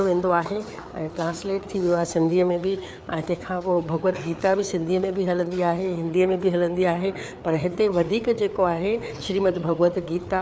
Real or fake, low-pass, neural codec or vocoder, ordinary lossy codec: fake; none; codec, 16 kHz, 4 kbps, FreqCodec, larger model; none